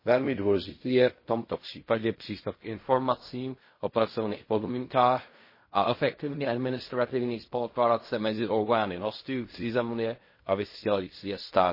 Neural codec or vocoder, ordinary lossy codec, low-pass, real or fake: codec, 16 kHz in and 24 kHz out, 0.4 kbps, LongCat-Audio-Codec, fine tuned four codebook decoder; MP3, 24 kbps; 5.4 kHz; fake